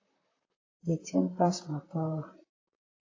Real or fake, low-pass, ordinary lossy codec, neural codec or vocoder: fake; 7.2 kHz; AAC, 32 kbps; vocoder, 44.1 kHz, 128 mel bands, Pupu-Vocoder